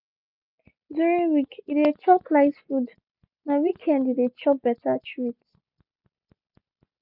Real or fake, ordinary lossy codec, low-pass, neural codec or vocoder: real; none; 5.4 kHz; none